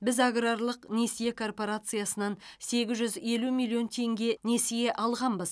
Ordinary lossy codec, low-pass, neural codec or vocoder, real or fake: none; none; none; real